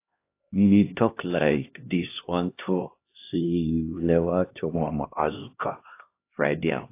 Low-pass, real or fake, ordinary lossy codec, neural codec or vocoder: 3.6 kHz; fake; AAC, 24 kbps; codec, 16 kHz, 1 kbps, X-Codec, HuBERT features, trained on LibriSpeech